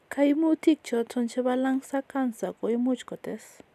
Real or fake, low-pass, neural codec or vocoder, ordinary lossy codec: real; 14.4 kHz; none; none